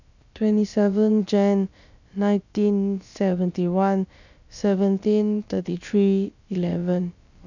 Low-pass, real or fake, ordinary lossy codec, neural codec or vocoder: 7.2 kHz; fake; none; codec, 16 kHz, about 1 kbps, DyCAST, with the encoder's durations